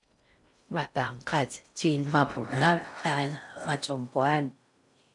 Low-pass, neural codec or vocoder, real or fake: 10.8 kHz; codec, 16 kHz in and 24 kHz out, 0.6 kbps, FocalCodec, streaming, 4096 codes; fake